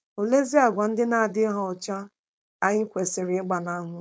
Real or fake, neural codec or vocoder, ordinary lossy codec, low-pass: fake; codec, 16 kHz, 4.8 kbps, FACodec; none; none